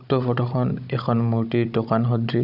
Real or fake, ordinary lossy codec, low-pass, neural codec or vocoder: fake; none; 5.4 kHz; codec, 16 kHz, 16 kbps, FunCodec, trained on Chinese and English, 50 frames a second